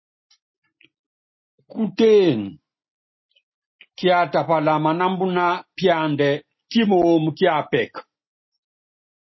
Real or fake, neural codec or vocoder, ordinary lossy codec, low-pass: real; none; MP3, 24 kbps; 7.2 kHz